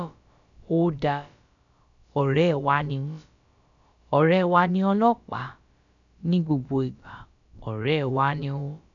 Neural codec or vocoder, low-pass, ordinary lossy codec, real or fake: codec, 16 kHz, about 1 kbps, DyCAST, with the encoder's durations; 7.2 kHz; none; fake